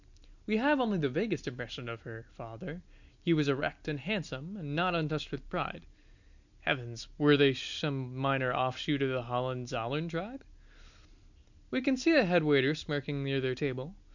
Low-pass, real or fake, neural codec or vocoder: 7.2 kHz; real; none